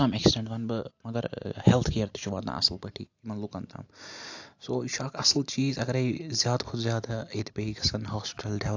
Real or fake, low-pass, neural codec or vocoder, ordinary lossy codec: real; 7.2 kHz; none; AAC, 48 kbps